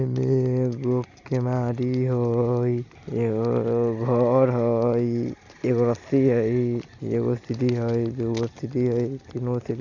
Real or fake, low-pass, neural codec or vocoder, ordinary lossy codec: real; 7.2 kHz; none; none